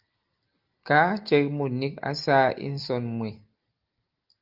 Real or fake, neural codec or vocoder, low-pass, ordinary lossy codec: real; none; 5.4 kHz; Opus, 32 kbps